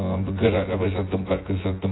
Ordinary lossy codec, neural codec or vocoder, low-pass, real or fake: AAC, 16 kbps; vocoder, 24 kHz, 100 mel bands, Vocos; 7.2 kHz; fake